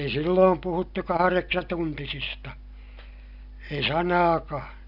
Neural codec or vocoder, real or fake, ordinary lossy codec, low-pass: none; real; none; 5.4 kHz